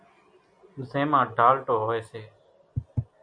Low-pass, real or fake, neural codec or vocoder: 9.9 kHz; real; none